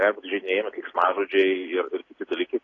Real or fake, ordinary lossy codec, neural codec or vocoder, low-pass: real; AAC, 32 kbps; none; 7.2 kHz